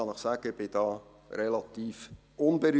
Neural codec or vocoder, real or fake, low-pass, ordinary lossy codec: none; real; none; none